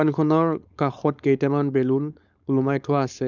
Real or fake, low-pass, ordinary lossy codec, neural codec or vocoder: fake; 7.2 kHz; none; codec, 16 kHz, 4.8 kbps, FACodec